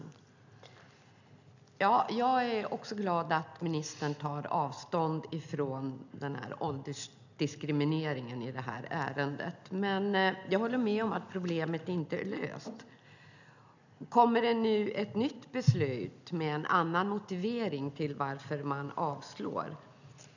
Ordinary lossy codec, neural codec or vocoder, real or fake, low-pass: none; none; real; 7.2 kHz